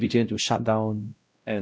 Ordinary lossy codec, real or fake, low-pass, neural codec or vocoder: none; fake; none; codec, 16 kHz, 0.5 kbps, X-Codec, WavLM features, trained on Multilingual LibriSpeech